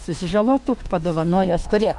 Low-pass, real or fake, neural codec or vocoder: 10.8 kHz; fake; autoencoder, 48 kHz, 32 numbers a frame, DAC-VAE, trained on Japanese speech